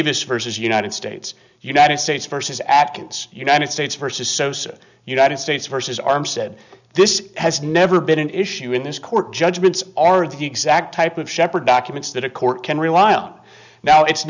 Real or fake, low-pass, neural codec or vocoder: real; 7.2 kHz; none